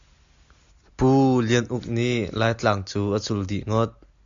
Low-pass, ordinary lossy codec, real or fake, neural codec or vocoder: 7.2 kHz; AAC, 64 kbps; real; none